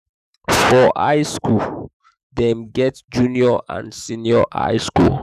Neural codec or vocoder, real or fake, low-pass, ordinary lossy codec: autoencoder, 48 kHz, 128 numbers a frame, DAC-VAE, trained on Japanese speech; fake; 14.4 kHz; none